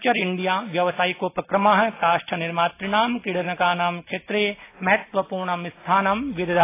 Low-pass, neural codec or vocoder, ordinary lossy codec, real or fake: 3.6 kHz; none; AAC, 24 kbps; real